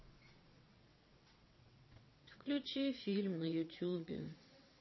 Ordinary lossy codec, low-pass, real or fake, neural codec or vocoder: MP3, 24 kbps; 7.2 kHz; fake; vocoder, 22.05 kHz, 80 mel bands, WaveNeXt